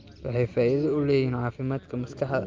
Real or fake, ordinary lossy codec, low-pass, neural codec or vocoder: real; Opus, 24 kbps; 7.2 kHz; none